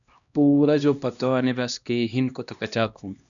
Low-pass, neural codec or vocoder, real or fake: 7.2 kHz; codec, 16 kHz, 1 kbps, X-Codec, HuBERT features, trained on LibriSpeech; fake